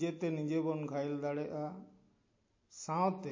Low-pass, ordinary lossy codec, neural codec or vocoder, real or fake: 7.2 kHz; MP3, 32 kbps; none; real